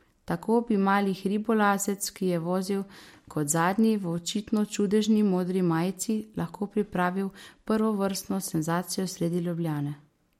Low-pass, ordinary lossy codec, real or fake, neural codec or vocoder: 19.8 kHz; MP3, 64 kbps; real; none